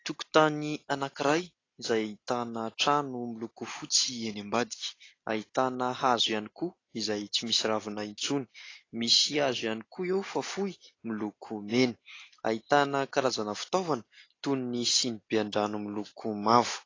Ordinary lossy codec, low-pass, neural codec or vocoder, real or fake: AAC, 32 kbps; 7.2 kHz; none; real